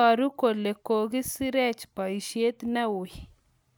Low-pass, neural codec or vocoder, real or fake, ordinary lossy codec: none; none; real; none